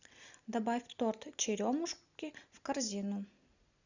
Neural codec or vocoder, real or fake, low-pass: none; real; 7.2 kHz